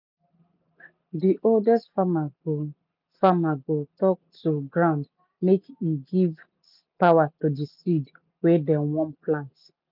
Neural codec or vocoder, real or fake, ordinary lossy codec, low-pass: none; real; none; 5.4 kHz